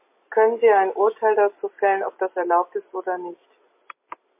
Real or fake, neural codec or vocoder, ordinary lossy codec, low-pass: real; none; MP3, 24 kbps; 3.6 kHz